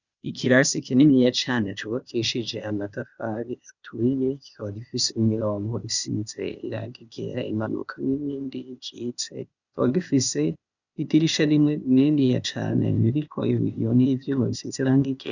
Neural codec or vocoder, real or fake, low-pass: codec, 16 kHz, 0.8 kbps, ZipCodec; fake; 7.2 kHz